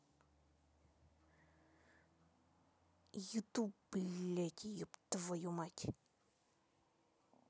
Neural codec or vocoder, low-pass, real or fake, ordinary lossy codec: none; none; real; none